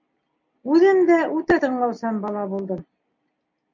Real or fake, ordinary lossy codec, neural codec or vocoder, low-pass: real; MP3, 48 kbps; none; 7.2 kHz